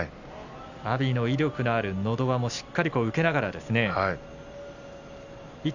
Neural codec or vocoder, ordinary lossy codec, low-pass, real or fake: none; none; 7.2 kHz; real